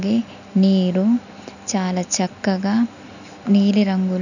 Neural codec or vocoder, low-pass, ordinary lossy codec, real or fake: none; 7.2 kHz; none; real